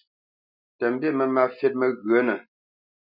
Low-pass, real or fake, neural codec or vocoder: 5.4 kHz; real; none